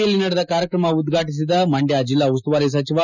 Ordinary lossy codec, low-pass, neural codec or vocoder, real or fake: none; 7.2 kHz; none; real